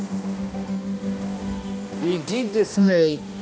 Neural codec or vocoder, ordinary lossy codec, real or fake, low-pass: codec, 16 kHz, 1 kbps, X-Codec, HuBERT features, trained on balanced general audio; none; fake; none